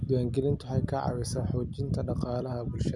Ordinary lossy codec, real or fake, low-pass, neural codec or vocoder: none; real; none; none